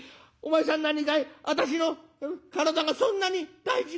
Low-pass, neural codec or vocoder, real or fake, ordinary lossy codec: none; none; real; none